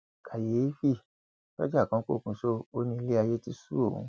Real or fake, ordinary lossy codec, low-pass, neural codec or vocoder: real; none; none; none